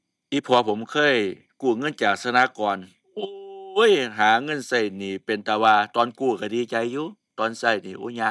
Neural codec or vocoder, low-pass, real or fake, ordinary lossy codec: none; none; real; none